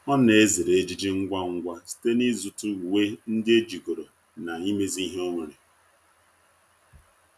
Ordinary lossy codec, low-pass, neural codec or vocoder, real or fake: none; 14.4 kHz; none; real